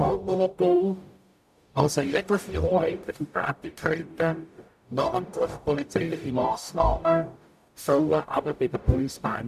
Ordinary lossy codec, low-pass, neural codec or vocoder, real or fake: none; 14.4 kHz; codec, 44.1 kHz, 0.9 kbps, DAC; fake